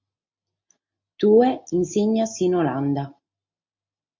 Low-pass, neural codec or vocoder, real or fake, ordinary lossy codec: 7.2 kHz; none; real; MP3, 48 kbps